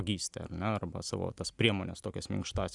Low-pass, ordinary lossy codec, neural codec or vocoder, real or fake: 10.8 kHz; Opus, 64 kbps; none; real